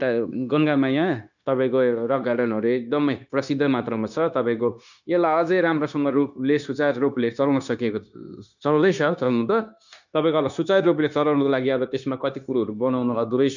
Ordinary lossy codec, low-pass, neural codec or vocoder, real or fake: none; 7.2 kHz; codec, 16 kHz, 0.9 kbps, LongCat-Audio-Codec; fake